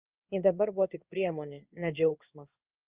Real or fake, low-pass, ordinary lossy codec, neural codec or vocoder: fake; 3.6 kHz; Opus, 32 kbps; codec, 16 kHz in and 24 kHz out, 1 kbps, XY-Tokenizer